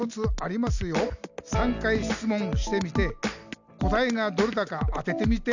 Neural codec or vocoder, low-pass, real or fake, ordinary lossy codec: none; 7.2 kHz; real; none